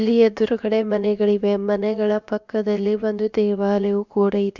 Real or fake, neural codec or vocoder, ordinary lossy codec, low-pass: fake; codec, 16 kHz, about 1 kbps, DyCAST, with the encoder's durations; Opus, 64 kbps; 7.2 kHz